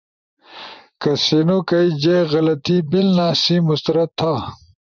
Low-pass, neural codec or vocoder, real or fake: 7.2 kHz; vocoder, 24 kHz, 100 mel bands, Vocos; fake